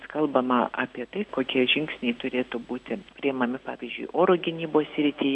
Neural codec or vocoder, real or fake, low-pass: none; real; 10.8 kHz